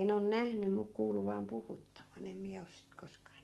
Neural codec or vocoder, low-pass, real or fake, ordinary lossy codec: codec, 44.1 kHz, 7.8 kbps, DAC; 19.8 kHz; fake; Opus, 24 kbps